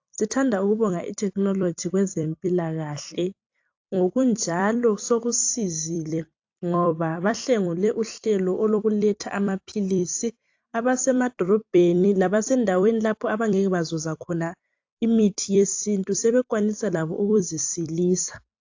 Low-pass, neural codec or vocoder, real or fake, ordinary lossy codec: 7.2 kHz; vocoder, 44.1 kHz, 128 mel bands every 256 samples, BigVGAN v2; fake; AAC, 48 kbps